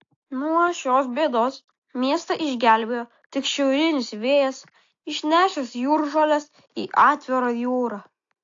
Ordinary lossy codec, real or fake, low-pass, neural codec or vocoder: AAC, 48 kbps; real; 7.2 kHz; none